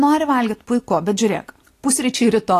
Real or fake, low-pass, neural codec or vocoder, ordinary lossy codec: real; 14.4 kHz; none; AAC, 48 kbps